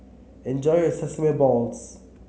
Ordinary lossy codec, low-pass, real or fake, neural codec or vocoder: none; none; real; none